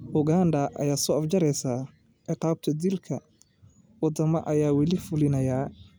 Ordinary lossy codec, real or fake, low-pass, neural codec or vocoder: none; real; none; none